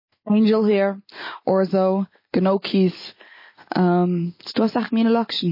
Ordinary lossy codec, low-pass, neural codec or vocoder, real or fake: MP3, 24 kbps; 5.4 kHz; none; real